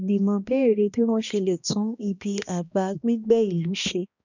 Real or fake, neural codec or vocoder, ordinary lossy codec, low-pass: fake; codec, 16 kHz, 1 kbps, X-Codec, HuBERT features, trained on balanced general audio; none; 7.2 kHz